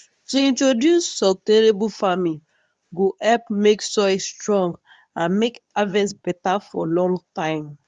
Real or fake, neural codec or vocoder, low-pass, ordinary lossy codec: fake; codec, 24 kHz, 0.9 kbps, WavTokenizer, medium speech release version 2; 10.8 kHz; none